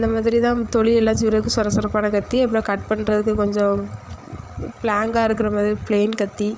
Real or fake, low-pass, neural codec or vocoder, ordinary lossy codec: fake; none; codec, 16 kHz, 8 kbps, FreqCodec, larger model; none